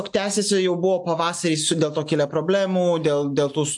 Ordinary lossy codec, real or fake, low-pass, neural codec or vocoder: AAC, 64 kbps; real; 10.8 kHz; none